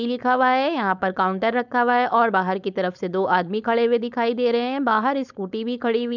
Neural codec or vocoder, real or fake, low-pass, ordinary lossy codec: codec, 16 kHz, 4.8 kbps, FACodec; fake; 7.2 kHz; none